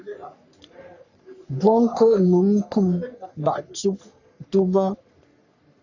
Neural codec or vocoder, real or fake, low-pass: codec, 44.1 kHz, 3.4 kbps, Pupu-Codec; fake; 7.2 kHz